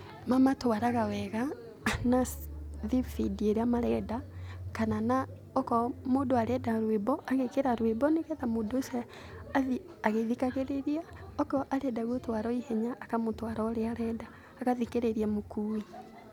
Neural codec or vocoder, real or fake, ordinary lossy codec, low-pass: none; real; none; 19.8 kHz